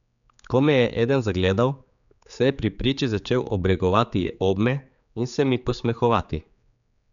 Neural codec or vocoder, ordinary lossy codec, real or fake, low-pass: codec, 16 kHz, 4 kbps, X-Codec, HuBERT features, trained on general audio; none; fake; 7.2 kHz